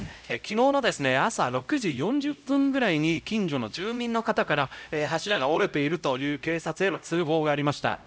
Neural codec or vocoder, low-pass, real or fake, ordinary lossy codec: codec, 16 kHz, 0.5 kbps, X-Codec, HuBERT features, trained on LibriSpeech; none; fake; none